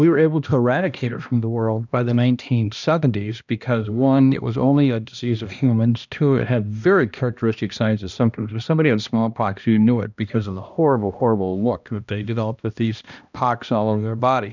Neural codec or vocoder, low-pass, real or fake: codec, 16 kHz, 1 kbps, X-Codec, HuBERT features, trained on balanced general audio; 7.2 kHz; fake